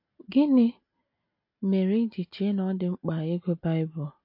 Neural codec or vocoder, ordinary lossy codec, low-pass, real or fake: none; MP3, 32 kbps; 5.4 kHz; real